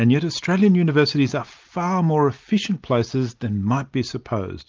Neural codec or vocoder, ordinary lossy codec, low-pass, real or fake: none; Opus, 32 kbps; 7.2 kHz; real